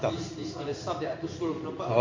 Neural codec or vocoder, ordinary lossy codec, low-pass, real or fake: codec, 16 kHz in and 24 kHz out, 1 kbps, XY-Tokenizer; MP3, 48 kbps; 7.2 kHz; fake